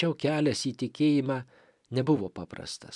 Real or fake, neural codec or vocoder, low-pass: fake; vocoder, 44.1 kHz, 128 mel bands every 256 samples, BigVGAN v2; 10.8 kHz